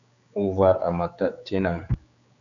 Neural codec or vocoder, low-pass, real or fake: codec, 16 kHz, 4 kbps, X-Codec, HuBERT features, trained on general audio; 7.2 kHz; fake